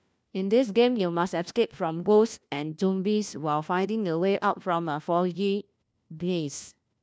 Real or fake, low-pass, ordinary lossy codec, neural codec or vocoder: fake; none; none; codec, 16 kHz, 1 kbps, FunCodec, trained on LibriTTS, 50 frames a second